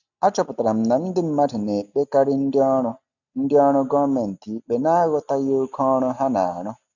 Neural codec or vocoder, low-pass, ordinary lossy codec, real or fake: none; 7.2 kHz; none; real